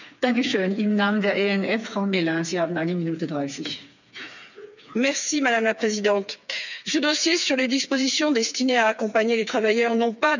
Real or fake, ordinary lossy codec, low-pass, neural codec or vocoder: fake; none; 7.2 kHz; codec, 16 kHz, 4 kbps, FreqCodec, smaller model